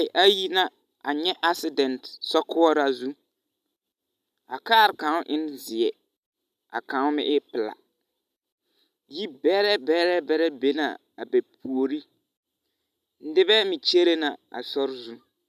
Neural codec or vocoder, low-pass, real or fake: none; 14.4 kHz; real